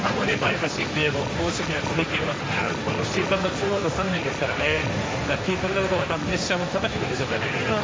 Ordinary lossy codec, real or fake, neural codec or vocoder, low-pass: none; fake; codec, 16 kHz, 1.1 kbps, Voila-Tokenizer; none